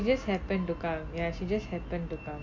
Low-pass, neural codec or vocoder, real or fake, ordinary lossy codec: 7.2 kHz; none; real; MP3, 48 kbps